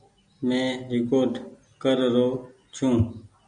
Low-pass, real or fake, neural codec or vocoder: 9.9 kHz; real; none